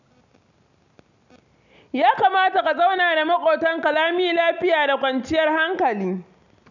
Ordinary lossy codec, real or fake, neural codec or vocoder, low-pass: none; real; none; 7.2 kHz